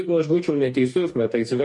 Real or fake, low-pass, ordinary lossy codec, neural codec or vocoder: fake; 10.8 kHz; MP3, 48 kbps; codec, 44.1 kHz, 2.6 kbps, DAC